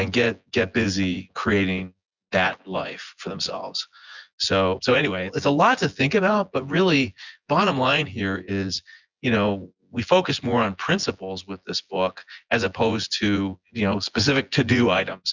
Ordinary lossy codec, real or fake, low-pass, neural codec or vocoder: Opus, 64 kbps; fake; 7.2 kHz; vocoder, 24 kHz, 100 mel bands, Vocos